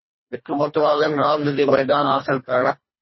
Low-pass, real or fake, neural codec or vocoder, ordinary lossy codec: 7.2 kHz; fake; codec, 24 kHz, 1.5 kbps, HILCodec; MP3, 24 kbps